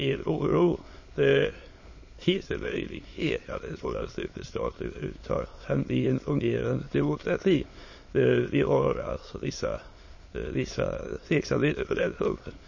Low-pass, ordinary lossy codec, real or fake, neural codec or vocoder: 7.2 kHz; MP3, 32 kbps; fake; autoencoder, 22.05 kHz, a latent of 192 numbers a frame, VITS, trained on many speakers